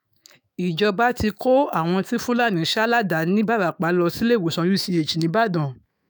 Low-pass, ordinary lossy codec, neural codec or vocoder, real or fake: none; none; autoencoder, 48 kHz, 128 numbers a frame, DAC-VAE, trained on Japanese speech; fake